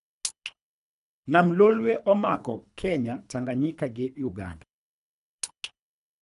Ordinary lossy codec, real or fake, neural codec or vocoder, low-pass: none; fake; codec, 24 kHz, 3 kbps, HILCodec; 10.8 kHz